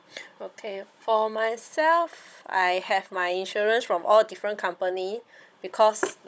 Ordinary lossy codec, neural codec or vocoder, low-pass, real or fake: none; codec, 16 kHz, 16 kbps, FunCodec, trained on Chinese and English, 50 frames a second; none; fake